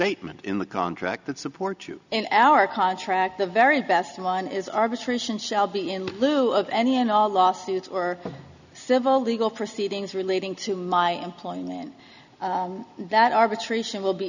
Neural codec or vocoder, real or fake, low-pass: none; real; 7.2 kHz